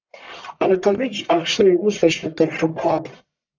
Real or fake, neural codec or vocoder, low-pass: fake; codec, 44.1 kHz, 1.7 kbps, Pupu-Codec; 7.2 kHz